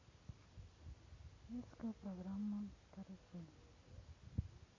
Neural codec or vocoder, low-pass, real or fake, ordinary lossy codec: none; 7.2 kHz; real; MP3, 32 kbps